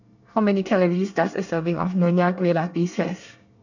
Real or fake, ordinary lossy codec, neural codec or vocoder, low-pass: fake; none; codec, 24 kHz, 1 kbps, SNAC; 7.2 kHz